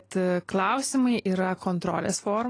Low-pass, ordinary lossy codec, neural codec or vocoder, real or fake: 9.9 kHz; AAC, 32 kbps; none; real